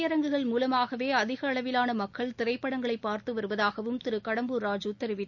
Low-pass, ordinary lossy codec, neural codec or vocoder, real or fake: 7.2 kHz; none; none; real